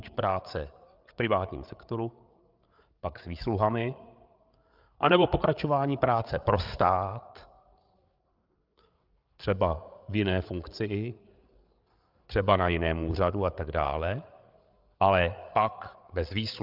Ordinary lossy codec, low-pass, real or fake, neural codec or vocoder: Opus, 24 kbps; 5.4 kHz; fake; codec, 16 kHz, 8 kbps, FreqCodec, larger model